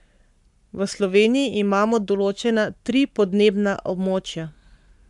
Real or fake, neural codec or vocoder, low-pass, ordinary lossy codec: fake; codec, 44.1 kHz, 7.8 kbps, Pupu-Codec; 10.8 kHz; none